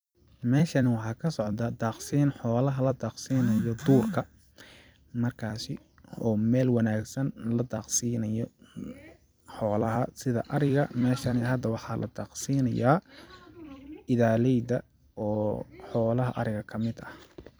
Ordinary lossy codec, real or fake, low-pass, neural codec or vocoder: none; fake; none; vocoder, 44.1 kHz, 128 mel bands every 256 samples, BigVGAN v2